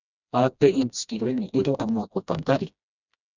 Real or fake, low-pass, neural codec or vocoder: fake; 7.2 kHz; codec, 16 kHz, 1 kbps, FreqCodec, smaller model